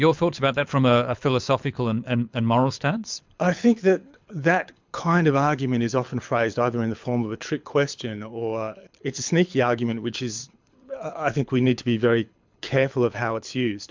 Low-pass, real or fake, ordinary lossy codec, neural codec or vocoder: 7.2 kHz; fake; MP3, 64 kbps; codec, 24 kHz, 6 kbps, HILCodec